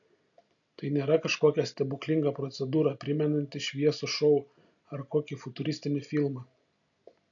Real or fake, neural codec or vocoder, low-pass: real; none; 7.2 kHz